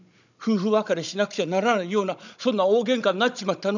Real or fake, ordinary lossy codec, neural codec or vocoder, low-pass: fake; none; codec, 16 kHz, 16 kbps, FunCodec, trained on Chinese and English, 50 frames a second; 7.2 kHz